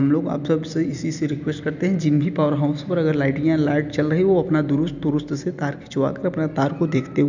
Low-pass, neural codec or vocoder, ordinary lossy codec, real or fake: 7.2 kHz; none; none; real